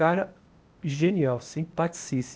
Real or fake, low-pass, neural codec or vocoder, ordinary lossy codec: fake; none; codec, 16 kHz, 0.8 kbps, ZipCodec; none